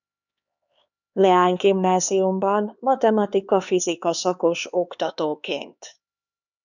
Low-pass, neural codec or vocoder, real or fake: 7.2 kHz; codec, 16 kHz, 4 kbps, X-Codec, HuBERT features, trained on LibriSpeech; fake